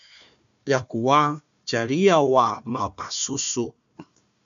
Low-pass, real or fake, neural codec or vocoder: 7.2 kHz; fake; codec, 16 kHz, 1 kbps, FunCodec, trained on Chinese and English, 50 frames a second